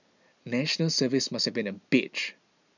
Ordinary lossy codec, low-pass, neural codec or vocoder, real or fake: none; 7.2 kHz; none; real